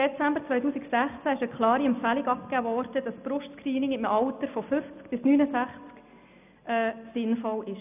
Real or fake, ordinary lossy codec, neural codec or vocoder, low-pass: real; none; none; 3.6 kHz